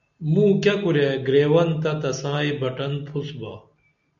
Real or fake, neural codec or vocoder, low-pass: real; none; 7.2 kHz